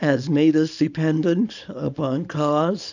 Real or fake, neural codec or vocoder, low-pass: fake; codec, 24 kHz, 0.9 kbps, WavTokenizer, small release; 7.2 kHz